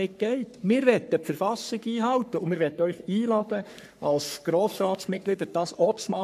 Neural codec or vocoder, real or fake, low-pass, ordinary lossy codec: codec, 44.1 kHz, 3.4 kbps, Pupu-Codec; fake; 14.4 kHz; none